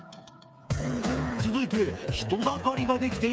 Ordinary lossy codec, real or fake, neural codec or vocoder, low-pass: none; fake; codec, 16 kHz, 4 kbps, FreqCodec, smaller model; none